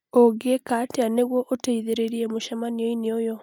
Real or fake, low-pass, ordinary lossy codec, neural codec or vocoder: real; 19.8 kHz; none; none